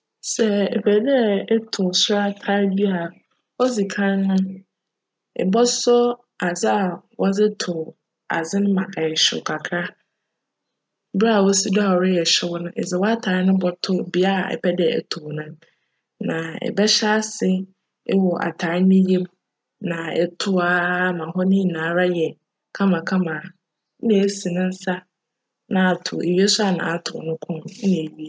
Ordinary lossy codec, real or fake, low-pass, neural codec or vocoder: none; real; none; none